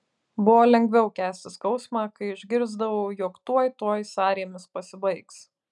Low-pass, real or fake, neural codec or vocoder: 10.8 kHz; real; none